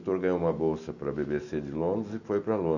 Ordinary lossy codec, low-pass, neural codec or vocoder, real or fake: none; 7.2 kHz; none; real